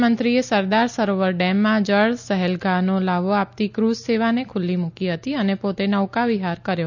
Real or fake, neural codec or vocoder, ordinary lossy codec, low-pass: real; none; none; 7.2 kHz